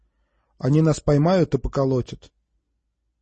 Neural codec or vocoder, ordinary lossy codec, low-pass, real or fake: none; MP3, 32 kbps; 10.8 kHz; real